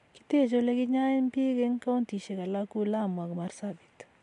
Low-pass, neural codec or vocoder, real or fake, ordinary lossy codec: 10.8 kHz; none; real; MP3, 64 kbps